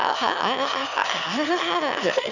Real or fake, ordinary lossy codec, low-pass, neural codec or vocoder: fake; none; 7.2 kHz; autoencoder, 22.05 kHz, a latent of 192 numbers a frame, VITS, trained on one speaker